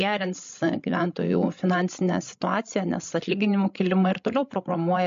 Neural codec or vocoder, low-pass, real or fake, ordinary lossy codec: codec, 16 kHz, 16 kbps, FreqCodec, larger model; 7.2 kHz; fake; MP3, 48 kbps